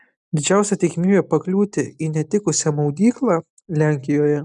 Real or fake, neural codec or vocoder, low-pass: real; none; 10.8 kHz